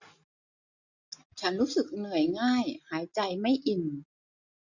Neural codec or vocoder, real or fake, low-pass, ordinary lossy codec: none; real; 7.2 kHz; none